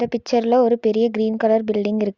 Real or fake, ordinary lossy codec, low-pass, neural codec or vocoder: real; Opus, 64 kbps; 7.2 kHz; none